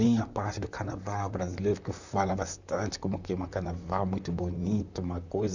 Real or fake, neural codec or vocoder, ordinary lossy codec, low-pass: fake; vocoder, 44.1 kHz, 128 mel bands, Pupu-Vocoder; none; 7.2 kHz